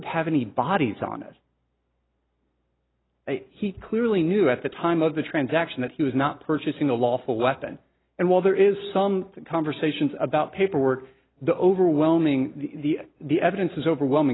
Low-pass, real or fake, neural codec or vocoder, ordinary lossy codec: 7.2 kHz; real; none; AAC, 16 kbps